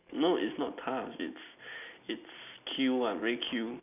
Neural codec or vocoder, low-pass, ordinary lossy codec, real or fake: none; 3.6 kHz; none; real